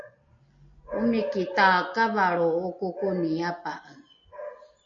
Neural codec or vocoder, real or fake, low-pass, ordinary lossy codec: none; real; 7.2 kHz; MP3, 48 kbps